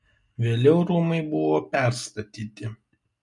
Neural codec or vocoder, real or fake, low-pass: none; real; 10.8 kHz